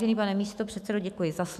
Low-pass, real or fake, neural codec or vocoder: 14.4 kHz; fake; autoencoder, 48 kHz, 128 numbers a frame, DAC-VAE, trained on Japanese speech